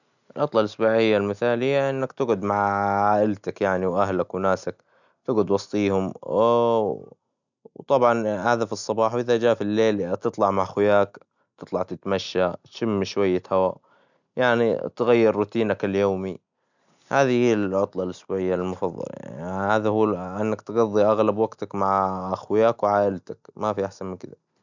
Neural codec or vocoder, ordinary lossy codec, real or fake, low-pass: none; none; real; 7.2 kHz